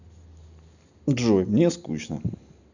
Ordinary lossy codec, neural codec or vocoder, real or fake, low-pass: none; none; real; 7.2 kHz